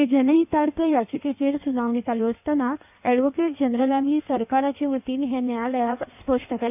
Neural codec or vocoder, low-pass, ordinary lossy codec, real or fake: codec, 16 kHz in and 24 kHz out, 1.1 kbps, FireRedTTS-2 codec; 3.6 kHz; none; fake